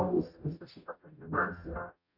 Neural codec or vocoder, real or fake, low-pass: codec, 44.1 kHz, 0.9 kbps, DAC; fake; 5.4 kHz